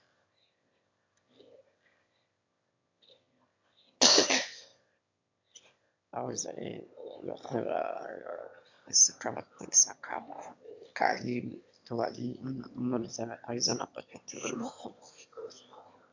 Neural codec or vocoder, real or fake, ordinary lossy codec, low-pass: autoencoder, 22.05 kHz, a latent of 192 numbers a frame, VITS, trained on one speaker; fake; MP3, 64 kbps; 7.2 kHz